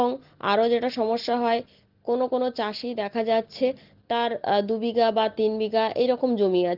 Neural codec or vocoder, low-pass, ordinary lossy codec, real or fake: none; 5.4 kHz; Opus, 16 kbps; real